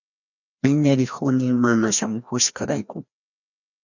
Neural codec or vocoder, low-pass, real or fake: codec, 16 kHz, 1 kbps, FreqCodec, larger model; 7.2 kHz; fake